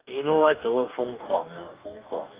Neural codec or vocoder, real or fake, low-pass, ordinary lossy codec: codec, 44.1 kHz, 2.6 kbps, DAC; fake; 3.6 kHz; Opus, 24 kbps